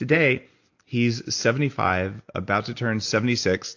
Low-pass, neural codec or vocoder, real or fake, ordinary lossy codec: 7.2 kHz; none; real; AAC, 48 kbps